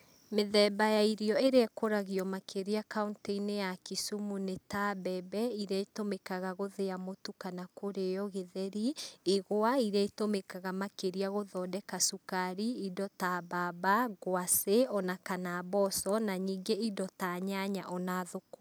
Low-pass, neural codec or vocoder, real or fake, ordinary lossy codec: none; none; real; none